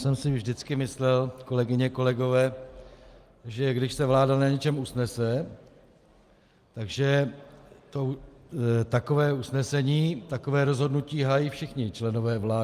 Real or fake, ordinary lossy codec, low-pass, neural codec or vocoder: real; Opus, 32 kbps; 14.4 kHz; none